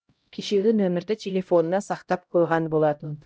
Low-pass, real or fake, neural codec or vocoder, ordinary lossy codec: none; fake; codec, 16 kHz, 0.5 kbps, X-Codec, HuBERT features, trained on LibriSpeech; none